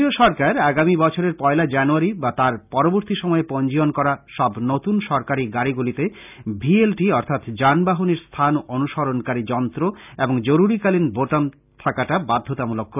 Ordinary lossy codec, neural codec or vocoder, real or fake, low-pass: none; none; real; 3.6 kHz